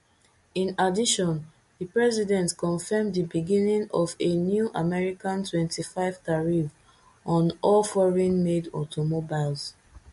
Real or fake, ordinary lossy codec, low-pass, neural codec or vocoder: real; MP3, 48 kbps; 14.4 kHz; none